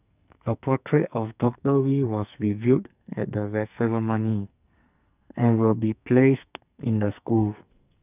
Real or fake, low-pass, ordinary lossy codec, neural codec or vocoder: fake; 3.6 kHz; none; codec, 44.1 kHz, 2.6 kbps, SNAC